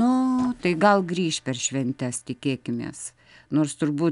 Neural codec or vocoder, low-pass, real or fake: none; 10.8 kHz; real